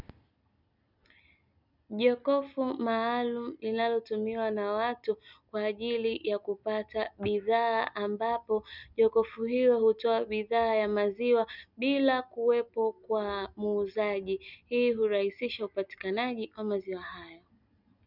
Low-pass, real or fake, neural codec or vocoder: 5.4 kHz; real; none